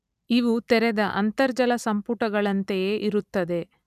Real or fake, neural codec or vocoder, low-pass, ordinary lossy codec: real; none; 14.4 kHz; none